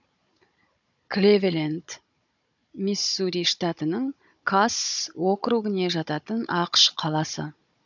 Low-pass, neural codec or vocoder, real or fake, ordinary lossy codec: 7.2 kHz; codec, 16 kHz, 16 kbps, FunCodec, trained on Chinese and English, 50 frames a second; fake; none